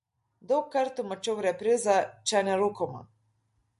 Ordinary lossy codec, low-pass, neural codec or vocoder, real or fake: MP3, 48 kbps; 14.4 kHz; none; real